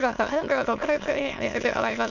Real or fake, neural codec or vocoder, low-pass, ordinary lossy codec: fake; autoencoder, 22.05 kHz, a latent of 192 numbers a frame, VITS, trained on many speakers; 7.2 kHz; none